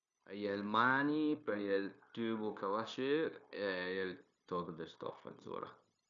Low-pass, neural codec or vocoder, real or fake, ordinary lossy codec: 7.2 kHz; codec, 16 kHz, 0.9 kbps, LongCat-Audio-Codec; fake; none